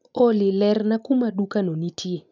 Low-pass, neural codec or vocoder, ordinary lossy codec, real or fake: 7.2 kHz; none; none; real